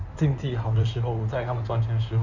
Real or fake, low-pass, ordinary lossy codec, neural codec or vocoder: fake; 7.2 kHz; Opus, 64 kbps; codec, 16 kHz in and 24 kHz out, 2.2 kbps, FireRedTTS-2 codec